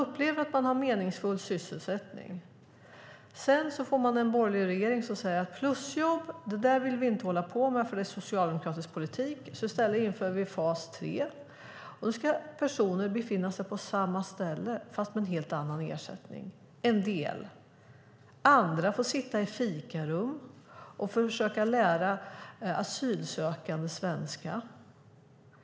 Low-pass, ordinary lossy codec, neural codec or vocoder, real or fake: none; none; none; real